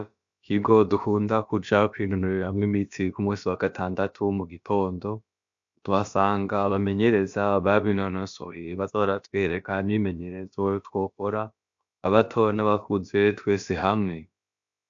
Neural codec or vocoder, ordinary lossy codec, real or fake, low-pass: codec, 16 kHz, about 1 kbps, DyCAST, with the encoder's durations; AAC, 64 kbps; fake; 7.2 kHz